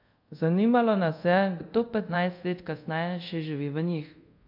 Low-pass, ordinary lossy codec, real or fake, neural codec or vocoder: 5.4 kHz; MP3, 48 kbps; fake; codec, 24 kHz, 0.5 kbps, DualCodec